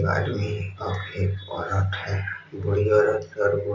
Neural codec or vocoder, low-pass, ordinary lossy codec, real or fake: none; 7.2 kHz; MP3, 64 kbps; real